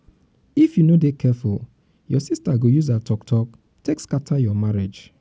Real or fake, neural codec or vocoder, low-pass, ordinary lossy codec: real; none; none; none